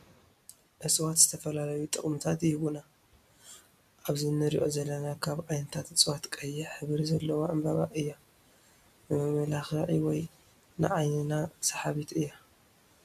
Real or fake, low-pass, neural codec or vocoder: real; 14.4 kHz; none